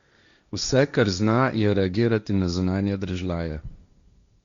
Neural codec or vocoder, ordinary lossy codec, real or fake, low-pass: codec, 16 kHz, 1.1 kbps, Voila-Tokenizer; Opus, 64 kbps; fake; 7.2 kHz